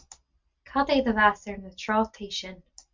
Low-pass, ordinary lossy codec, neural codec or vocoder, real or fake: 7.2 kHz; MP3, 64 kbps; none; real